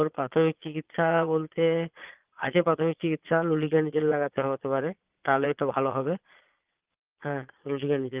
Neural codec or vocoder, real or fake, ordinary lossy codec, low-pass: vocoder, 22.05 kHz, 80 mel bands, Vocos; fake; Opus, 24 kbps; 3.6 kHz